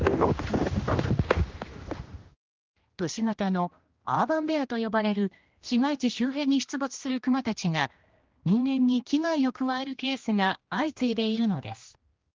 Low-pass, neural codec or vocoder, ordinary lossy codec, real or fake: 7.2 kHz; codec, 16 kHz, 1 kbps, X-Codec, HuBERT features, trained on general audio; Opus, 32 kbps; fake